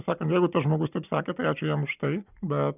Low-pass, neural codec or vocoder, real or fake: 3.6 kHz; none; real